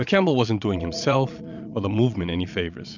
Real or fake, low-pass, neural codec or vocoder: fake; 7.2 kHz; vocoder, 22.05 kHz, 80 mel bands, Vocos